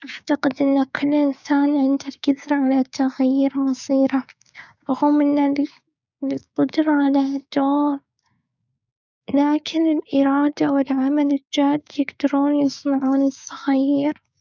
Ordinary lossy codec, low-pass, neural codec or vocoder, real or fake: none; 7.2 kHz; codec, 16 kHz, 6 kbps, DAC; fake